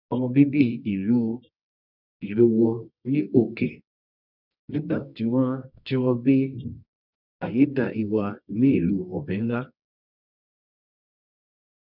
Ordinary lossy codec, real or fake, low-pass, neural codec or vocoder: none; fake; 5.4 kHz; codec, 24 kHz, 0.9 kbps, WavTokenizer, medium music audio release